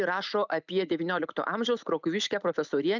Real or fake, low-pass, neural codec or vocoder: real; 7.2 kHz; none